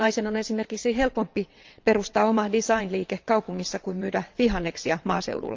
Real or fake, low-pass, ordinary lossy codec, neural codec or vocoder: fake; 7.2 kHz; Opus, 24 kbps; vocoder, 22.05 kHz, 80 mel bands, WaveNeXt